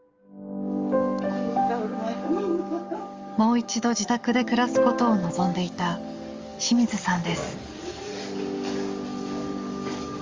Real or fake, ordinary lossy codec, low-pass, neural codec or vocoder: fake; Opus, 32 kbps; 7.2 kHz; codec, 44.1 kHz, 7.8 kbps, DAC